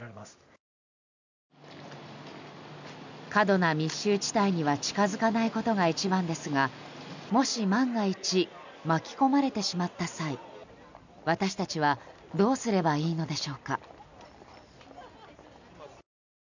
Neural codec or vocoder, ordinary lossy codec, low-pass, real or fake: none; none; 7.2 kHz; real